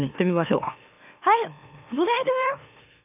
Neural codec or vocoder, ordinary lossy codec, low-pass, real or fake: autoencoder, 44.1 kHz, a latent of 192 numbers a frame, MeloTTS; none; 3.6 kHz; fake